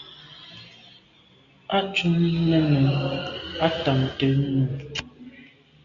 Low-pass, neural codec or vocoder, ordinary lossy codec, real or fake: 7.2 kHz; none; Opus, 64 kbps; real